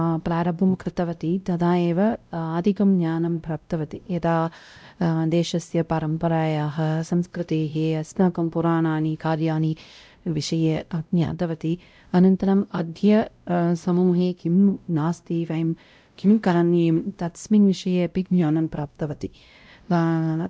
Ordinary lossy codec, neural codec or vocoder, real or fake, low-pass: none; codec, 16 kHz, 0.5 kbps, X-Codec, WavLM features, trained on Multilingual LibriSpeech; fake; none